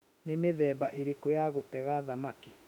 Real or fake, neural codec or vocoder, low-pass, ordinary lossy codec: fake; autoencoder, 48 kHz, 32 numbers a frame, DAC-VAE, trained on Japanese speech; 19.8 kHz; none